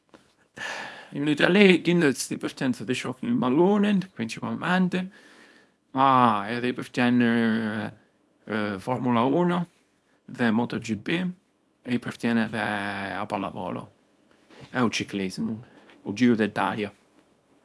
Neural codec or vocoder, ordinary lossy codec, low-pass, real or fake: codec, 24 kHz, 0.9 kbps, WavTokenizer, small release; none; none; fake